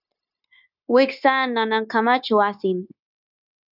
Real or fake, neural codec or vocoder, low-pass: fake; codec, 16 kHz, 0.9 kbps, LongCat-Audio-Codec; 5.4 kHz